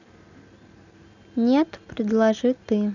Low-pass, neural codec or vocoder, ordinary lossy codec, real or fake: 7.2 kHz; none; none; real